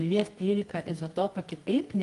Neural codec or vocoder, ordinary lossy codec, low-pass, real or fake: codec, 24 kHz, 0.9 kbps, WavTokenizer, medium music audio release; Opus, 32 kbps; 10.8 kHz; fake